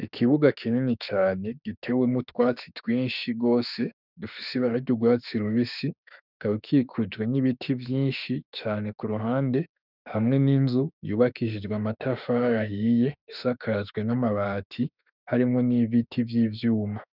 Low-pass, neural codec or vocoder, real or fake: 5.4 kHz; autoencoder, 48 kHz, 32 numbers a frame, DAC-VAE, trained on Japanese speech; fake